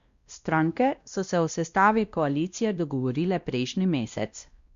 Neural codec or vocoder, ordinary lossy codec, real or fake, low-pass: codec, 16 kHz, 1 kbps, X-Codec, WavLM features, trained on Multilingual LibriSpeech; Opus, 64 kbps; fake; 7.2 kHz